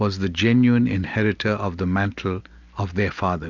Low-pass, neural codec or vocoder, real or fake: 7.2 kHz; none; real